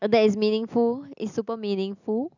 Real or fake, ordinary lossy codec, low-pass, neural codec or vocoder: real; none; 7.2 kHz; none